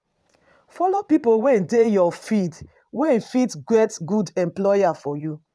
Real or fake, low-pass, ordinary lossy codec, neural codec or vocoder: fake; none; none; vocoder, 22.05 kHz, 80 mel bands, Vocos